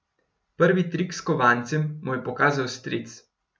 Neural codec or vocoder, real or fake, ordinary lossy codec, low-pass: none; real; none; none